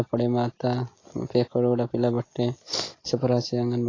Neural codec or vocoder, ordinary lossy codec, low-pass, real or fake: none; AAC, 32 kbps; 7.2 kHz; real